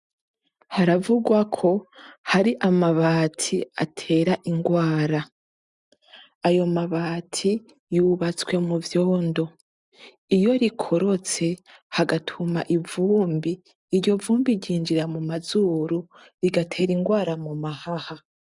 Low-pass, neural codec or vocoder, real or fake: 10.8 kHz; none; real